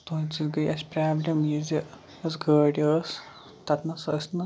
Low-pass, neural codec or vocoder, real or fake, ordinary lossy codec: none; none; real; none